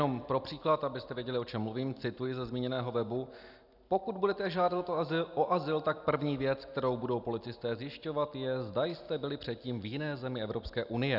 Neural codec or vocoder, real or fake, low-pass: none; real; 5.4 kHz